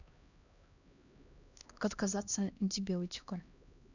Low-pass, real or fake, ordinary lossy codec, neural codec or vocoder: 7.2 kHz; fake; none; codec, 16 kHz, 1 kbps, X-Codec, HuBERT features, trained on LibriSpeech